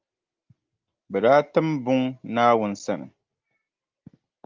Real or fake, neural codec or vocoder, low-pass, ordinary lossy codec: real; none; 7.2 kHz; Opus, 24 kbps